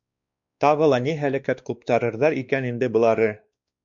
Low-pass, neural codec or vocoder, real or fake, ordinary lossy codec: 7.2 kHz; codec, 16 kHz, 2 kbps, X-Codec, WavLM features, trained on Multilingual LibriSpeech; fake; MP3, 64 kbps